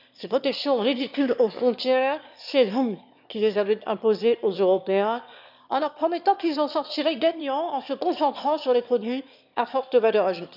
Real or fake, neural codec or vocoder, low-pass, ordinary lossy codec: fake; autoencoder, 22.05 kHz, a latent of 192 numbers a frame, VITS, trained on one speaker; 5.4 kHz; none